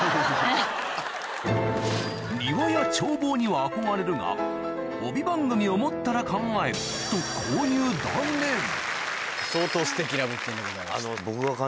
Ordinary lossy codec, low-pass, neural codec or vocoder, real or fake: none; none; none; real